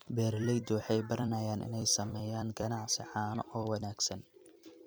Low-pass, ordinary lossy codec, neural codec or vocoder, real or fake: none; none; vocoder, 44.1 kHz, 128 mel bands every 256 samples, BigVGAN v2; fake